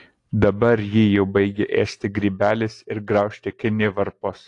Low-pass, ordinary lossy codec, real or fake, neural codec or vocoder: 10.8 kHz; AAC, 48 kbps; real; none